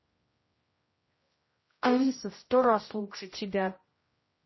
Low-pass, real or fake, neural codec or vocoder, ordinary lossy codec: 7.2 kHz; fake; codec, 16 kHz, 0.5 kbps, X-Codec, HuBERT features, trained on general audio; MP3, 24 kbps